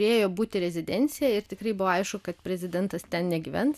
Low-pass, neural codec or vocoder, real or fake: 14.4 kHz; none; real